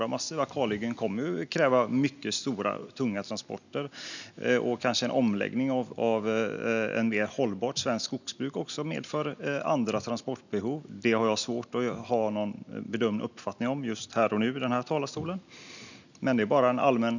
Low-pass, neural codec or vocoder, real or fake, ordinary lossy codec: 7.2 kHz; none; real; none